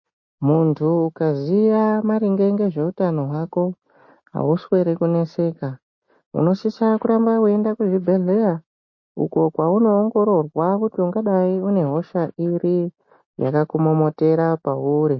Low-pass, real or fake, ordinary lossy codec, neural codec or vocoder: 7.2 kHz; real; MP3, 32 kbps; none